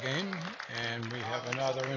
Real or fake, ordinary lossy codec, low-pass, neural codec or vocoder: real; AAC, 32 kbps; 7.2 kHz; none